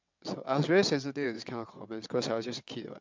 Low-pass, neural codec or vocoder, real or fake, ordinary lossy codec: 7.2 kHz; vocoder, 22.05 kHz, 80 mel bands, WaveNeXt; fake; MP3, 64 kbps